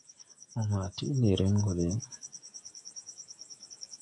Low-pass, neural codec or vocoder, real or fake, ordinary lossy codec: 10.8 kHz; codec, 44.1 kHz, 7.8 kbps, DAC; fake; MP3, 64 kbps